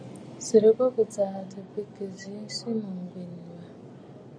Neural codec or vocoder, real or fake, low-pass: none; real; 9.9 kHz